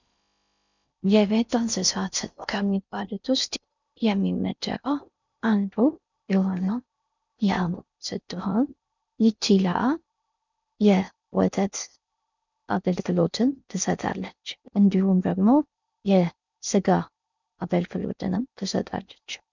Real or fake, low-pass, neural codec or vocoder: fake; 7.2 kHz; codec, 16 kHz in and 24 kHz out, 0.6 kbps, FocalCodec, streaming, 4096 codes